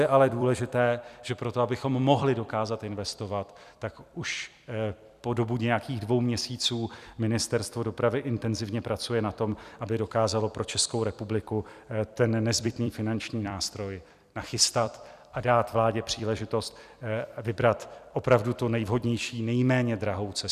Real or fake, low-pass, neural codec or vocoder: fake; 14.4 kHz; vocoder, 44.1 kHz, 128 mel bands every 256 samples, BigVGAN v2